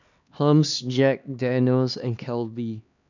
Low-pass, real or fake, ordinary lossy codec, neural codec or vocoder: 7.2 kHz; fake; none; codec, 16 kHz, 2 kbps, X-Codec, HuBERT features, trained on LibriSpeech